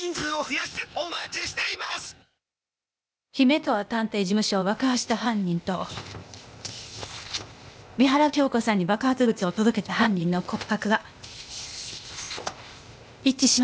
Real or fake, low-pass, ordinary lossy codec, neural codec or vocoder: fake; none; none; codec, 16 kHz, 0.8 kbps, ZipCodec